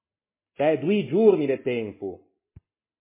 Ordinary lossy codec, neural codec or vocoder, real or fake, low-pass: MP3, 16 kbps; none; real; 3.6 kHz